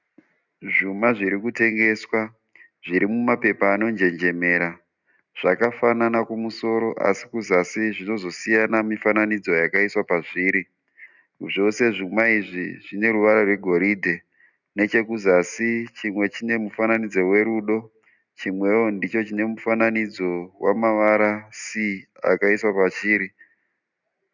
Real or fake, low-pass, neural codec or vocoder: real; 7.2 kHz; none